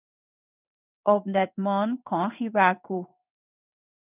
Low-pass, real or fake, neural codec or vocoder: 3.6 kHz; fake; codec, 16 kHz in and 24 kHz out, 1 kbps, XY-Tokenizer